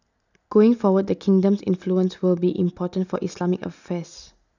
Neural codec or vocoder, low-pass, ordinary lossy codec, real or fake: none; 7.2 kHz; none; real